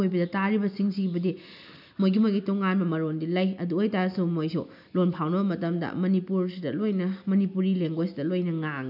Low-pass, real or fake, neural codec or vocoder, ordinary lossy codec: 5.4 kHz; real; none; none